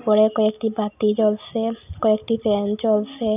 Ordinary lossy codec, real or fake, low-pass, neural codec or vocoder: none; real; 3.6 kHz; none